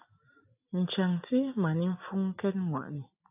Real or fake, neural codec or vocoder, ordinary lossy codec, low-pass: real; none; AAC, 32 kbps; 3.6 kHz